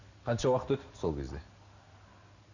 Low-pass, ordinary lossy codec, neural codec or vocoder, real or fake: 7.2 kHz; none; none; real